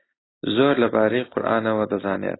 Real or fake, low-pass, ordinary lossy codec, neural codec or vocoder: real; 7.2 kHz; AAC, 16 kbps; none